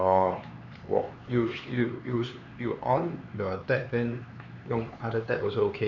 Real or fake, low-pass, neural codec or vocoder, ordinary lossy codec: fake; 7.2 kHz; codec, 16 kHz, 4 kbps, X-Codec, HuBERT features, trained on LibriSpeech; none